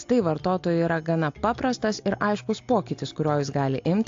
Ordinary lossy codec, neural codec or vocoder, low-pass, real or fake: AAC, 48 kbps; none; 7.2 kHz; real